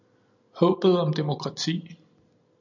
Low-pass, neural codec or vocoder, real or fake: 7.2 kHz; none; real